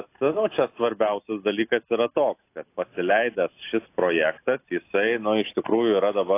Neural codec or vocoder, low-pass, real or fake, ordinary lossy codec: none; 3.6 kHz; real; AAC, 24 kbps